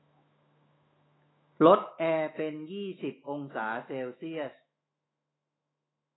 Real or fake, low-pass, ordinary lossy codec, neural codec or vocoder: fake; 7.2 kHz; AAC, 16 kbps; autoencoder, 48 kHz, 128 numbers a frame, DAC-VAE, trained on Japanese speech